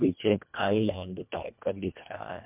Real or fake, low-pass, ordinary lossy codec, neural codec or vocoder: fake; 3.6 kHz; MP3, 32 kbps; codec, 24 kHz, 1.5 kbps, HILCodec